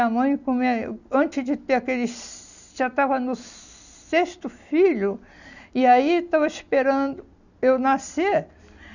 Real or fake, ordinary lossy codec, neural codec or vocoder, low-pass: real; none; none; 7.2 kHz